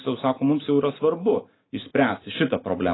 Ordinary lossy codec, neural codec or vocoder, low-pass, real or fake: AAC, 16 kbps; none; 7.2 kHz; real